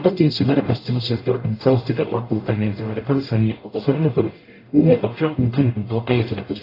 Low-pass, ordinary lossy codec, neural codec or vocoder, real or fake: 5.4 kHz; AAC, 24 kbps; codec, 44.1 kHz, 0.9 kbps, DAC; fake